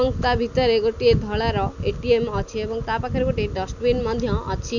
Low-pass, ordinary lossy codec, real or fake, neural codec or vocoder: 7.2 kHz; none; real; none